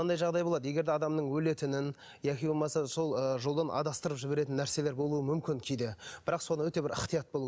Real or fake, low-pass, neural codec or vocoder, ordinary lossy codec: real; none; none; none